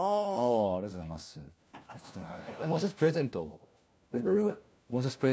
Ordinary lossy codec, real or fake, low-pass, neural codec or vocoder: none; fake; none; codec, 16 kHz, 1 kbps, FunCodec, trained on LibriTTS, 50 frames a second